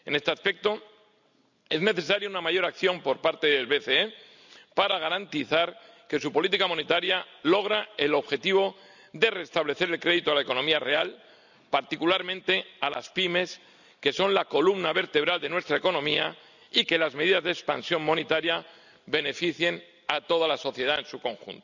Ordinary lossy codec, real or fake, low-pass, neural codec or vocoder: none; real; 7.2 kHz; none